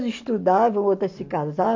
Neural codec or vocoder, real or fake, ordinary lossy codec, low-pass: none; real; MP3, 48 kbps; 7.2 kHz